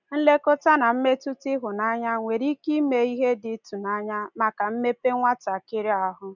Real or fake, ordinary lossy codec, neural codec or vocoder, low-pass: real; none; none; 7.2 kHz